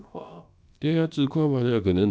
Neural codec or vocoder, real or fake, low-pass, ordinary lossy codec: codec, 16 kHz, about 1 kbps, DyCAST, with the encoder's durations; fake; none; none